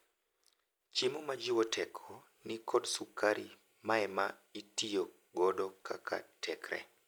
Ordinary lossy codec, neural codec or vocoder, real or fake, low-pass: none; none; real; none